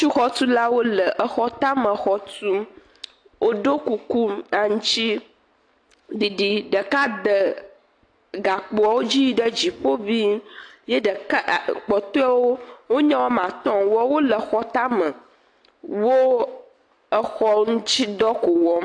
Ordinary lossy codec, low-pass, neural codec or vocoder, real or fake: AAC, 48 kbps; 9.9 kHz; none; real